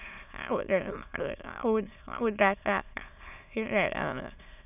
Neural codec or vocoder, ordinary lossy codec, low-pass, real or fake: autoencoder, 22.05 kHz, a latent of 192 numbers a frame, VITS, trained on many speakers; none; 3.6 kHz; fake